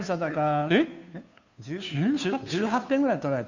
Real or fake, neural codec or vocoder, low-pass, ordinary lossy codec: fake; codec, 16 kHz, 2 kbps, FunCodec, trained on Chinese and English, 25 frames a second; 7.2 kHz; none